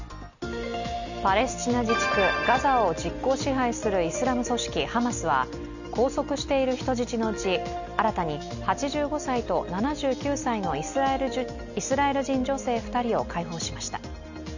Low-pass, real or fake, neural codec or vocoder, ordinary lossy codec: 7.2 kHz; real; none; none